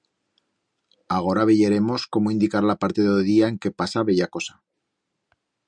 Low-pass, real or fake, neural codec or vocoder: 9.9 kHz; real; none